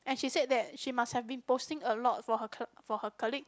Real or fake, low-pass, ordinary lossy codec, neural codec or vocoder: real; none; none; none